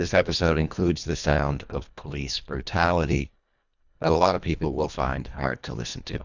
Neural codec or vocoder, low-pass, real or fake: codec, 24 kHz, 1.5 kbps, HILCodec; 7.2 kHz; fake